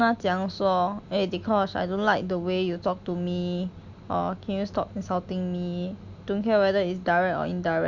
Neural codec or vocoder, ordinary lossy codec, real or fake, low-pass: none; none; real; 7.2 kHz